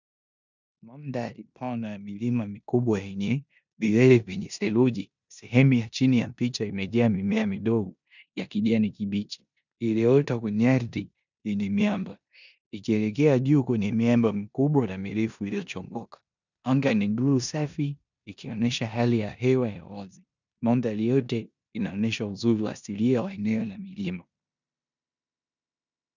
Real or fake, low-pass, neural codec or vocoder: fake; 7.2 kHz; codec, 16 kHz in and 24 kHz out, 0.9 kbps, LongCat-Audio-Codec, four codebook decoder